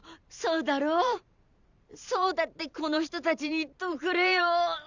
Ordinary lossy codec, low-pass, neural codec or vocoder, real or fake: Opus, 64 kbps; 7.2 kHz; vocoder, 44.1 kHz, 128 mel bands every 512 samples, BigVGAN v2; fake